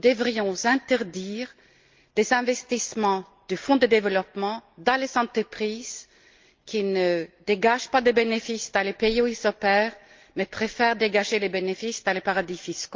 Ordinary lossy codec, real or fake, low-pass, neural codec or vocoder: Opus, 32 kbps; real; 7.2 kHz; none